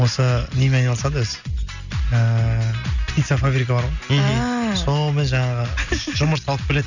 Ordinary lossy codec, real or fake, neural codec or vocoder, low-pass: none; real; none; 7.2 kHz